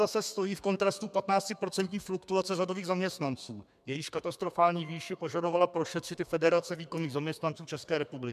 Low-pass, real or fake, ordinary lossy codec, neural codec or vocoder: 14.4 kHz; fake; MP3, 96 kbps; codec, 32 kHz, 1.9 kbps, SNAC